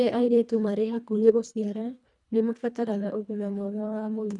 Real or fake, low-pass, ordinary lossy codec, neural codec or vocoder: fake; 10.8 kHz; none; codec, 24 kHz, 1.5 kbps, HILCodec